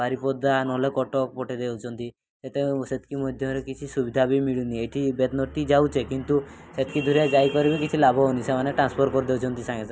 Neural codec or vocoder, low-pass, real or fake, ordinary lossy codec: none; none; real; none